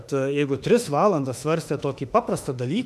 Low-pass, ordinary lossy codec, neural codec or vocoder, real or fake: 14.4 kHz; MP3, 96 kbps; autoencoder, 48 kHz, 32 numbers a frame, DAC-VAE, trained on Japanese speech; fake